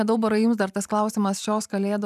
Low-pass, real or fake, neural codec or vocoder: 14.4 kHz; fake; vocoder, 44.1 kHz, 128 mel bands every 512 samples, BigVGAN v2